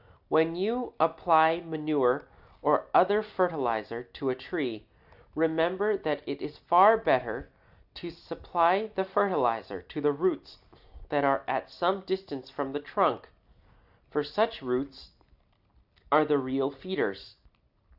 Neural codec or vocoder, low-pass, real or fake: none; 5.4 kHz; real